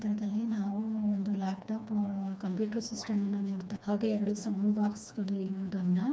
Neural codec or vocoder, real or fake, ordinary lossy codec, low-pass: codec, 16 kHz, 2 kbps, FreqCodec, smaller model; fake; none; none